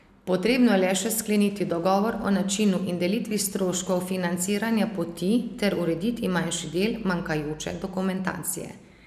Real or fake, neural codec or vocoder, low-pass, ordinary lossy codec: real; none; 14.4 kHz; none